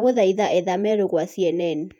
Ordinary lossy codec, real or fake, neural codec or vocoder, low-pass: none; fake; vocoder, 48 kHz, 128 mel bands, Vocos; 19.8 kHz